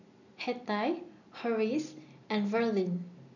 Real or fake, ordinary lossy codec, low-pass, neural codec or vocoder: real; none; 7.2 kHz; none